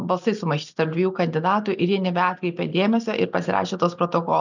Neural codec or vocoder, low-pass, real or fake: none; 7.2 kHz; real